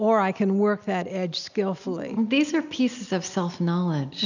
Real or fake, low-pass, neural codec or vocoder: real; 7.2 kHz; none